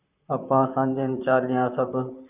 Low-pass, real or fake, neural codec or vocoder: 3.6 kHz; fake; vocoder, 44.1 kHz, 128 mel bands, Pupu-Vocoder